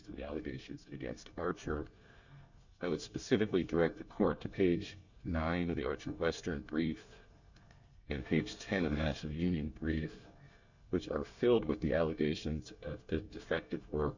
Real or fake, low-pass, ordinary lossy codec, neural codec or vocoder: fake; 7.2 kHz; Opus, 64 kbps; codec, 24 kHz, 1 kbps, SNAC